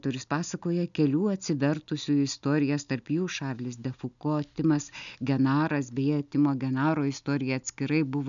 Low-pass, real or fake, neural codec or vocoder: 7.2 kHz; real; none